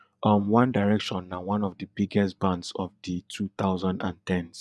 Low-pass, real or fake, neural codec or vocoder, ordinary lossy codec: none; fake; vocoder, 24 kHz, 100 mel bands, Vocos; none